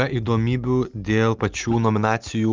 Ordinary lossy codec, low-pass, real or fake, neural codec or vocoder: Opus, 24 kbps; 7.2 kHz; real; none